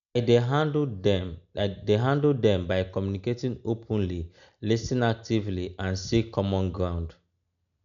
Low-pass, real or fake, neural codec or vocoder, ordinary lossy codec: 7.2 kHz; real; none; none